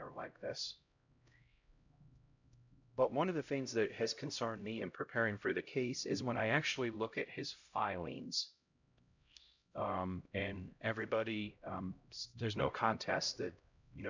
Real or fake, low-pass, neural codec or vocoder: fake; 7.2 kHz; codec, 16 kHz, 0.5 kbps, X-Codec, HuBERT features, trained on LibriSpeech